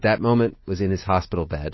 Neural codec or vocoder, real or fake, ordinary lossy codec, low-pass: none; real; MP3, 24 kbps; 7.2 kHz